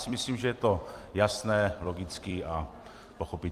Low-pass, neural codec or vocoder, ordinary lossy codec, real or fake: 14.4 kHz; vocoder, 44.1 kHz, 128 mel bands every 256 samples, BigVGAN v2; Opus, 32 kbps; fake